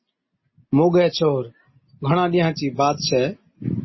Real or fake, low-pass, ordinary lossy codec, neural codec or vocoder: real; 7.2 kHz; MP3, 24 kbps; none